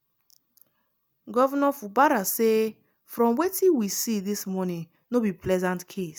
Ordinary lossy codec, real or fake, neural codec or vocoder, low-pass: none; real; none; none